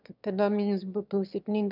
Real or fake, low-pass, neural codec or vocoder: fake; 5.4 kHz; autoencoder, 22.05 kHz, a latent of 192 numbers a frame, VITS, trained on one speaker